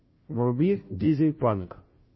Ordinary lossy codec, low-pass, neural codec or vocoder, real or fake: MP3, 24 kbps; 7.2 kHz; codec, 16 kHz, 0.5 kbps, FunCodec, trained on Chinese and English, 25 frames a second; fake